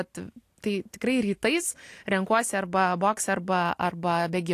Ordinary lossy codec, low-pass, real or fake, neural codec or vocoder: AAC, 64 kbps; 14.4 kHz; real; none